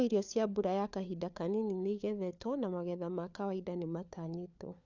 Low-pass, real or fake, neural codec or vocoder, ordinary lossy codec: 7.2 kHz; fake; codec, 16 kHz, 4 kbps, FunCodec, trained on LibriTTS, 50 frames a second; none